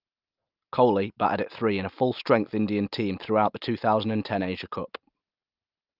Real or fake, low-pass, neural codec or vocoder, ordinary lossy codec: real; 5.4 kHz; none; Opus, 24 kbps